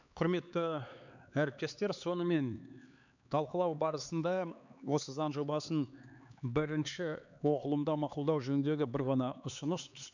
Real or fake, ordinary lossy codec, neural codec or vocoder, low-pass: fake; none; codec, 16 kHz, 4 kbps, X-Codec, HuBERT features, trained on LibriSpeech; 7.2 kHz